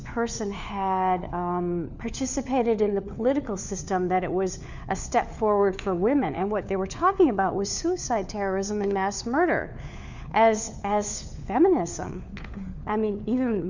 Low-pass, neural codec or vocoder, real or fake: 7.2 kHz; codec, 16 kHz, 4 kbps, FunCodec, trained on LibriTTS, 50 frames a second; fake